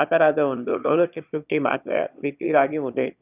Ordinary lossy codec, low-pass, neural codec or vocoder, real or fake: none; 3.6 kHz; autoencoder, 22.05 kHz, a latent of 192 numbers a frame, VITS, trained on one speaker; fake